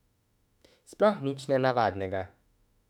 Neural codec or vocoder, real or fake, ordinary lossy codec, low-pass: autoencoder, 48 kHz, 32 numbers a frame, DAC-VAE, trained on Japanese speech; fake; none; 19.8 kHz